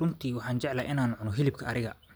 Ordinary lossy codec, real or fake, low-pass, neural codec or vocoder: none; real; none; none